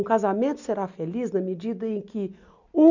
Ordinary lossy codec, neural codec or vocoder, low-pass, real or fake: none; none; 7.2 kHz; real